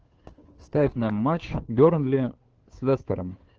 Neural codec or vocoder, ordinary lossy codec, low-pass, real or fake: codec, 16 kHz, 2 kbps, FunCodec, trained on Chinese and English, 25 frames a second; Opus, 16 kbps; 7.2 kHz; fake